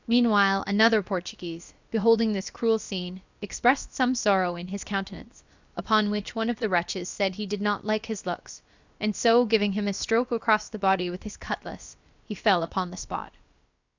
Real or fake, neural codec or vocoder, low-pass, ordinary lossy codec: fake; codec, 16 kHz, about 1 kbps, DyCAST, with the encoder's durations; 7.2 kHz; Opus, 64 kbps